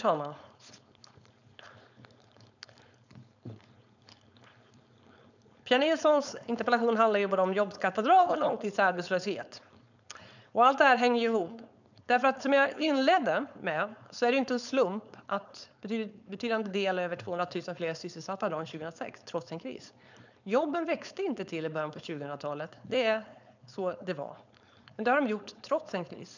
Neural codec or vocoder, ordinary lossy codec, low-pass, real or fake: codec, 16 kHz, 4.8 kbps, FACodec; none; 7.2 kHz; fake